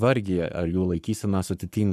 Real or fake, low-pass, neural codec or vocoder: fake; 14.4 kHz; codec, 44.1 kHz, 7.8 kbps, Pupu-Codec